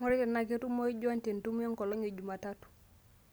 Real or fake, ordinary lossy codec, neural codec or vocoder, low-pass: real; none; none; none